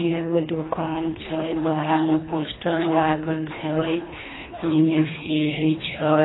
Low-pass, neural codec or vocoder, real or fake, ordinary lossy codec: 7.2 kHz; codec, 24 kHz, 1.5 kbps, HILCodec; fake; AAC, 16 kbps